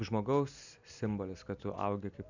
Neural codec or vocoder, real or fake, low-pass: none; real; 7.2 kHz